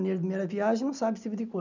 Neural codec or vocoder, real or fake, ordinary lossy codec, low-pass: none; real; none; 7.2 kHz